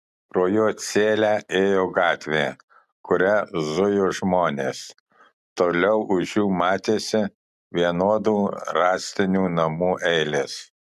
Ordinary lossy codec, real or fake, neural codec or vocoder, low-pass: MP3, 96 kbps; real; none; 14.4 kHz